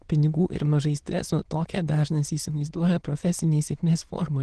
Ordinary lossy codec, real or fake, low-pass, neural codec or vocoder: Opus, 16 kbps; fake; 9.9 kHz; autoencoder, 22.05 kHz, a latent of 192 numbers a frame, VITS, trained on many speakers